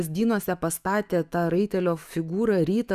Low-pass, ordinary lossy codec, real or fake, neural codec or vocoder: 14.4 kHz; Opus, 64 kbps; fake; autoencoder, 48 kHz, 128 numbers a frame, DAC-VAE, trained on Japanese speech